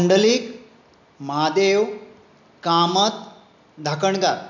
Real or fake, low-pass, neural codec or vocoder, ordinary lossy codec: real; 7.2 kHz; none; none